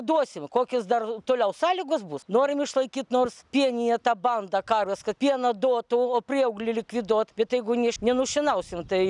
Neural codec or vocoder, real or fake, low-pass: none; real; 10.8 kHz